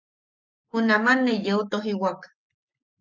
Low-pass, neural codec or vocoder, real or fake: 7.2 kHz; codec, 44.1 kHz, 7.8 kbps, Pupu-Codec; fake